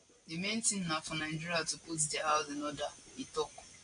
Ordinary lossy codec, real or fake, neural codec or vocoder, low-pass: AAC, 48 kbps; real; none; 9.9 kHz